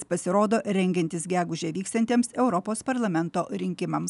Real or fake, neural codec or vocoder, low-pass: real; none; 10.8 kHz